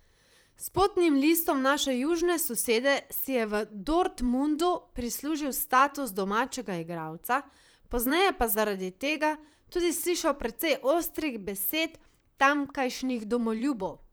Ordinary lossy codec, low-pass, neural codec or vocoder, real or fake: none; none; vocoder, 44.1 kHz, 128 mel bands, Pupu-Vocoder; fake